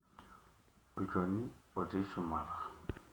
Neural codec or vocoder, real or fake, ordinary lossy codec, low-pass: vocoder, 48 kHz, 128 mel bands, Vocos; fake; none; 19.8 kHz